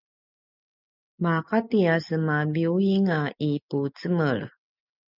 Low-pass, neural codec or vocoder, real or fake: 5.4 kHz; none; real